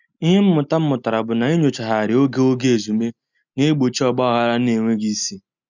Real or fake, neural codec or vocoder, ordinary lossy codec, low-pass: real; none; none; 7.2 kHz